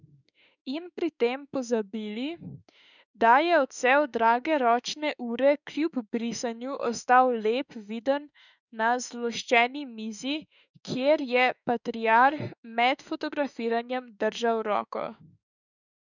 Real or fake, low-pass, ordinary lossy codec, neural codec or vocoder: fake; 7.2 kHz; none; autoencoder, 48 kHz, 32 numbers a frame, DAC-VAE, trained on Japanese speech